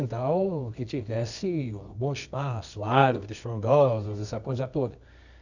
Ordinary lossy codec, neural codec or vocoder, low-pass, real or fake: none; codec, 24 kHz, 0.9 kbps, WavTokenizer, medium music audio release; 7.2 kHz; fake